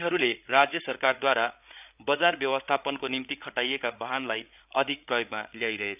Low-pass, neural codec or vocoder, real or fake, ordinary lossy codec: 3.6 kHz; codec, 16 kHz, 8 kbps, FreqCodec, larger model; fake; none